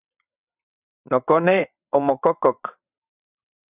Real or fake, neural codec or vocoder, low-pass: fake; vocoder, 22.05 kHz, 80 mel bands, WaveNeXt; 3.6 kHz